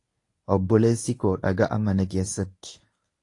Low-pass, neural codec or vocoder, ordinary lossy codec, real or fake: 10.8 kHz; codec, 24 kHz, 0.9 kbps, WavTokenizer, medium speech release version 1; AAC, 48 kbps; fake